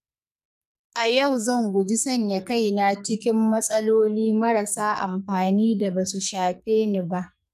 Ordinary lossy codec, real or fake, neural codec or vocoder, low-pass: none; fake; codec, 44.1 kHz, 2.6 kbps, SNAC; 14.4 kHz